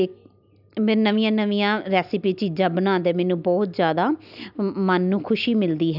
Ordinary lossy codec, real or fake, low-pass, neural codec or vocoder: none; real; 5.4 kHz; none